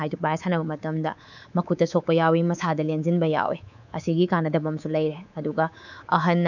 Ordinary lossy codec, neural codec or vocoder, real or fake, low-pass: none; codec, 24 kHz, 3.1 kbps, DualCodec; fake; 7.2 kHz